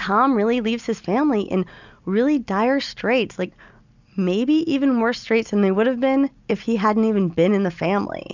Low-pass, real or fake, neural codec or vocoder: 7.2 kHz; real; none